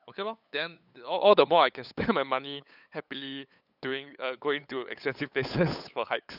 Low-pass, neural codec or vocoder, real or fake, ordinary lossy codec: 5.4 kHz; codec, 16 kHz, 8 kbps, FunCodec, trained on LibriTTS, 25 frames a second; fake; none